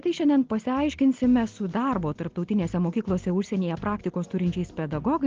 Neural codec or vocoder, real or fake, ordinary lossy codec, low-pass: none; real; Opus, 16 kbps; 7.2 kHz